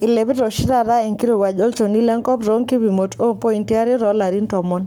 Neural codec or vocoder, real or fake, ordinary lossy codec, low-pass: codec, 44.1 kHz, 7.8 kbps, Pupu-Codec; fake; none; none